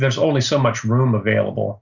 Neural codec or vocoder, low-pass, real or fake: none; 7.2 kHz; real